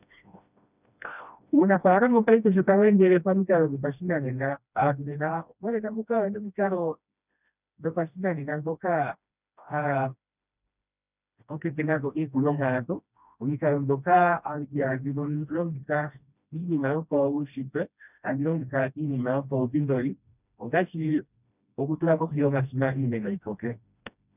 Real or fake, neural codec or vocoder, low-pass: fake; codec, 16 kHz, 1 kbps, FreqCodec, smaller model; 3.6 kHz